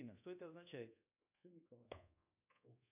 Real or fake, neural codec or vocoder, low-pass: fake; codec, 24 kHz, 1.2 kbps, DualCodec; 3.6 kHz